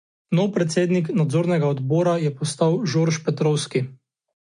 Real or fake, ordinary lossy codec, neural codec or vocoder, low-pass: real; MP3, 48 kbps; none; 9.9 kHz